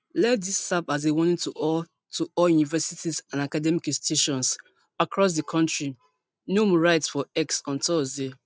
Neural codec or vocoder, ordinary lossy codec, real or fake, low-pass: none; none; real; none